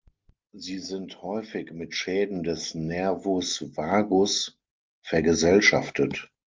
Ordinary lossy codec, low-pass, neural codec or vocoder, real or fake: Opus, 32 kbps; 7.2 kHz; none; real